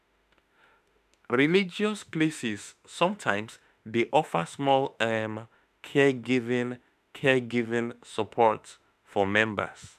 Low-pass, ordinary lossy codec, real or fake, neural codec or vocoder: 14.4 kHz; none; fake; autoencoder, 48 kHz, 32 numbers a frame, DAC-VAE, trained on Japanese speech